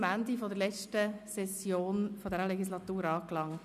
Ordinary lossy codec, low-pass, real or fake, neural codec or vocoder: none; 14.4 kHz; real; none